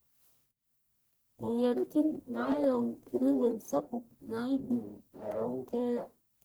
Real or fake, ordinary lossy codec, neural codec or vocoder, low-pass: fake; none; codec, 44.1 kHz, 1.7 kbps, Pupu-Codec; none